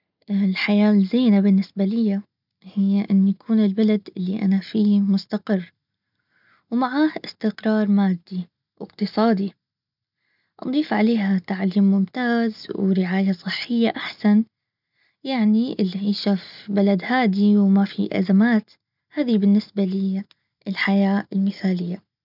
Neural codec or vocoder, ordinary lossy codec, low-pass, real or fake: none; none; 5.4 kHz; real